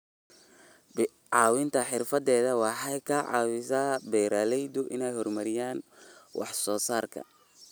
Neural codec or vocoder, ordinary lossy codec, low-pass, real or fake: none; none; none; real